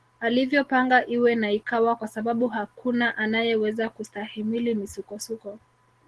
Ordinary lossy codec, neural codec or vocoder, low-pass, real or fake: Opus, 16 kbps; none; 10.8 kHz; real